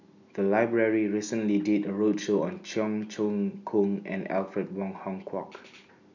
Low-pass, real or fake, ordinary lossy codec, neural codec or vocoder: 7.2 kHz; real; none; none